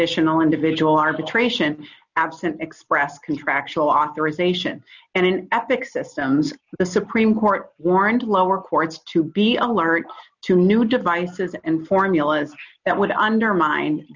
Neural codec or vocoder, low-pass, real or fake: none; 7.2 kHz; real